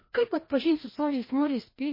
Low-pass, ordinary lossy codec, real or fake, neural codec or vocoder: 5.4 kHz; MP3, 24 kbps; fake; codec, 16 kHz, 1 kbps, FreqCodec, larger model